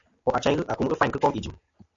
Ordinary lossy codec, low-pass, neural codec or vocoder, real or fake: AAC, 64 kbps; 7.2 kHz; none; real